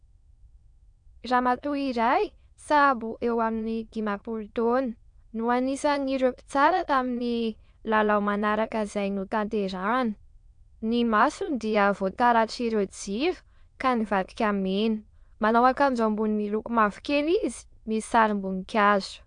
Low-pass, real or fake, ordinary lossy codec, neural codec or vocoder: 9.9 kHz; fake; AAC, 64 kbps; autoencoder, 22.05 kHz, a latent of 192 numbers a frame, VITS, trained on many speakers